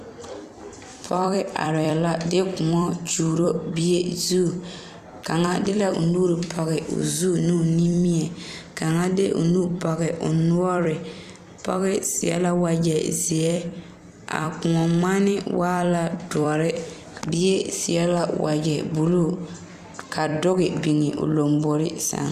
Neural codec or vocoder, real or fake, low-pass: vocoder, 44.1 kHz, 128 mel bands every 256 samples, BigVGAN v2; fake; 14.4 kHz